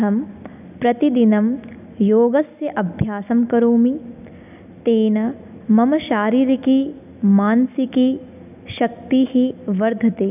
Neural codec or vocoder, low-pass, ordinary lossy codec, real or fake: none; 3.6 kHz; none; real